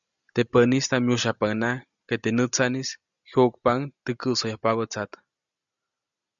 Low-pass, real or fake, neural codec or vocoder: 7.2 kHz; real; none